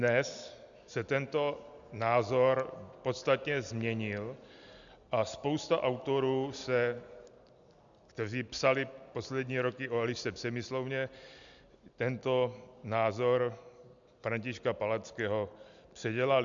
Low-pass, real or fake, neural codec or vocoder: 7.2 kHz; real; none